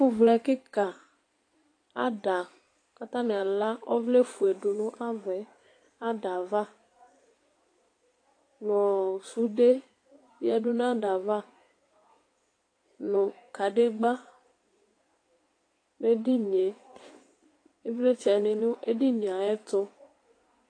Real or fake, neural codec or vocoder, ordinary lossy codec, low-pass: fake; codec, 16 kHz in and 24 kHz out, 2.2 kbps, FireRedTTS-2 codec; AAC, 48 kbps; 9.9 kHz